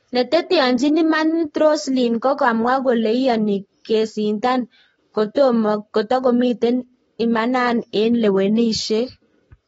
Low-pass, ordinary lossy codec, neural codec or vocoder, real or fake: 19.8 kHz; AAC, 24 kbps; codec, 44.1 kHz, 7.8 kbps, Pupu-Codec; fake